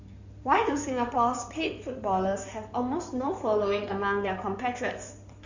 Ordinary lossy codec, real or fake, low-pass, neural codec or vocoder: none; fake; 7.2 kHz; codec, 16 kHz in and 24 kHz out, 2.2 kbps, FireRedTTS-2 codec